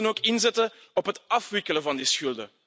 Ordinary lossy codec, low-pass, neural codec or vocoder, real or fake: none; none; none; real